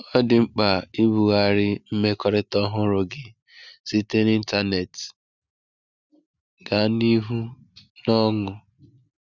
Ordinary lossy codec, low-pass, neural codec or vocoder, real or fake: none; 7.2 kHz; none; real